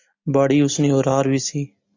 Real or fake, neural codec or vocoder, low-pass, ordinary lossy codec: real; none; 7.2 kHz; AAC, 48 kbps